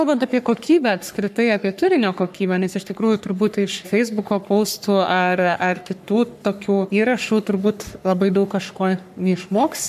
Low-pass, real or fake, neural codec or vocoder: 14.4 kHz; fake; codec, 44.1 kHz, 3.4 kbps, Pupu-Codec